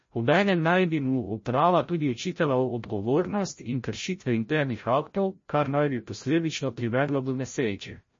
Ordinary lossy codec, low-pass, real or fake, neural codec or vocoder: MP3, 32 kbps; 7.2 kHz; fake; codec, 16 kHz, 0.5 kbps, FreqCodec, larger model